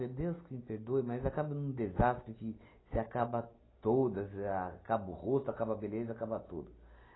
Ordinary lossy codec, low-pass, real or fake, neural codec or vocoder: AAC, 16 kbps; 7.2 kHz; real; none